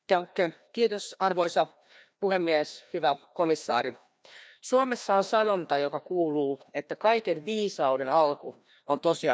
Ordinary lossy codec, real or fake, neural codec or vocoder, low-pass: none; fake; codec, 16 kHz, 1 kbps, FreqCodec, larger model; none